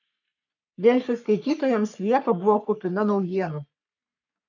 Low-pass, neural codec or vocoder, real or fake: 7.2 kHz; codec, 44.1 kHz, 3.4 kbps, Pupu-Codec; fake